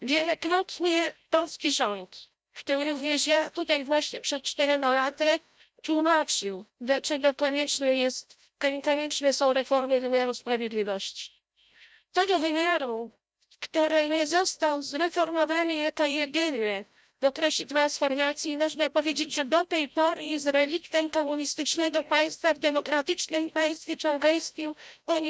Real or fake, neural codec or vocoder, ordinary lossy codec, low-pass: fake; codec, 16 kHz, 0.5 kbps, FreqCodec, larger model; none; none